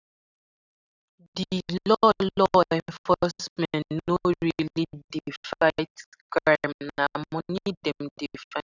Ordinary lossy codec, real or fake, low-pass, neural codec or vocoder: none; real; 7.2 kHz; none